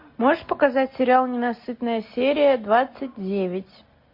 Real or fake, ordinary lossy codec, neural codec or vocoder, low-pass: real; MP3, 32 kbps; none; 5.4 kHz